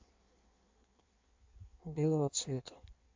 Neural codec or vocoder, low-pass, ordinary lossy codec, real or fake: codec, 16 kHz in and 24 kHz out, 1.1 kbps, FireRedTTS-2 codec; 7.2 kHz; MP3, 48 kbps; fake